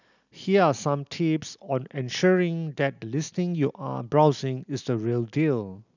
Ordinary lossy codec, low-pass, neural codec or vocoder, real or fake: none; 7.2 kHz; none; real